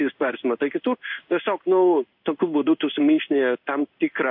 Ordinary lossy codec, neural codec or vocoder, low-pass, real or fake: AAC, 48 kbps; codec, 16 kHz in and 24 kHz out, 1 kbps, XY-Tokenizer; 5.4 kHz; fake